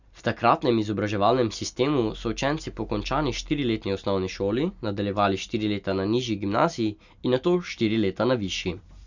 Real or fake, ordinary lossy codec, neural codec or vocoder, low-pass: real; none; none; 7.2 kHz